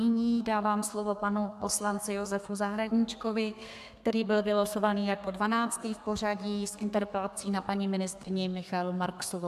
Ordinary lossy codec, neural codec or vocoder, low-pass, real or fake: AAC, 96 kbps; codec, 32 kHz, 1.9 kbps, SNAC; 14.4 kHz; fake